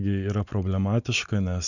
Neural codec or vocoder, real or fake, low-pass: none; real; 7.2 kHz